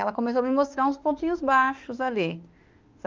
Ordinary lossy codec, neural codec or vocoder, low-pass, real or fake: Opus, 24 kbps; autoencoder, 48 kHz, 32 numbers a frame, DAC-VAE, trained on Japanese speech; 7.2 kHz; fake